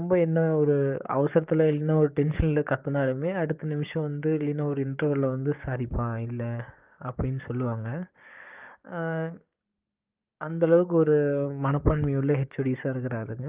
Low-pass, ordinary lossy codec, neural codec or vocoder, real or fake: 3.6 kHz; Opus, 24 kbps; codec, 16 kHz, 6 kbps, DAC; fake